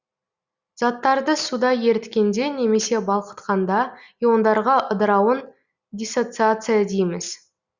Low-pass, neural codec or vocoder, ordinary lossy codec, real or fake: 7.2 kHz; none; Opus, 64 kbps; real